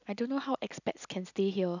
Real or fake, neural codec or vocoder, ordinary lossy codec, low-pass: real; none; none; 7.2 kHz